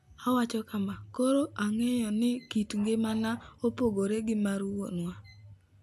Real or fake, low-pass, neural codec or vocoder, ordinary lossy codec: real; 14.4 kHz; none; AAC, 96 kbps